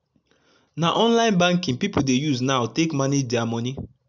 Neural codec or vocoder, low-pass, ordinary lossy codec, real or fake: none; 7.2 kHz; none; real